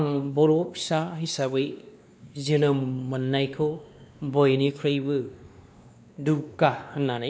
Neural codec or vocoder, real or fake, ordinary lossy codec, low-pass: codec, 16 kHz, 2 kbps, X-Codec, WavLM features, trained on Multilingual LibriSpeech; fake; none; none